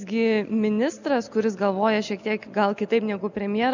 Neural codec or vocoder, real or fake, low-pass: none; real; 7.2 kHz